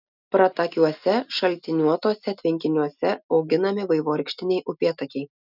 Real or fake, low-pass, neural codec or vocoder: real; 5.4 kHz; none